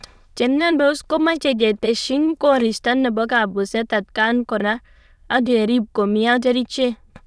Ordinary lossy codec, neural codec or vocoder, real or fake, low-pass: none; autoencoder, 22.05 kHz, a latent of 192 numbers a frame, VITS, trained on many speakers; fake; none